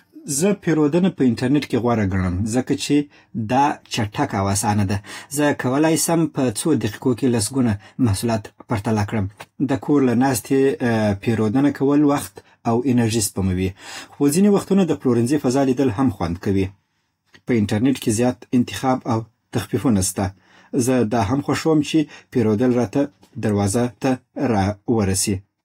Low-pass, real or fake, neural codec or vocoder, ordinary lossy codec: 19.8 kHz; real; none; AAC, 48 kbps